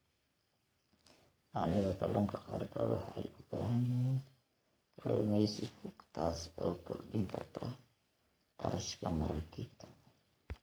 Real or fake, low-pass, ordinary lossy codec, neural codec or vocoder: fake; none; none; codec, 44.1 kHz, 3.4 kbps, Pupu-Codec